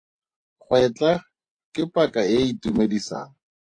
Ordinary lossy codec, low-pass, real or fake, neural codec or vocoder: AAC, 48 kbps; 9.9 kHz; fake; vocoder, 24 kHz, 100 mel bands, Vocos